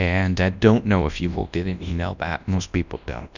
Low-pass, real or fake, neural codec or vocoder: 7.2 kHz; fake; codec, 24 kHz, 0.9 kbps, WavTokenizer, large speech release